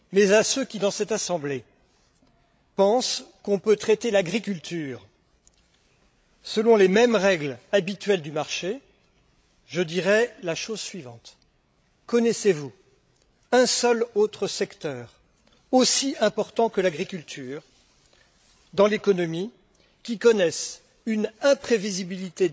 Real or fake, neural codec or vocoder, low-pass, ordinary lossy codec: fake; codec, 16 kHz, 16 kbps, FreqCodec, larger model; none; none